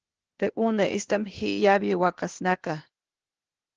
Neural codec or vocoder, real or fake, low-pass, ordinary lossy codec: codec, 16 kHz, 0.8 kbps, ZipCodec; fake; 7.2 kHz; Opus, 16 kbps